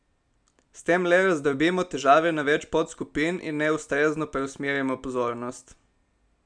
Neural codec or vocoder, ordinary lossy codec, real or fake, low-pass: none; none; real; 9.9 kHz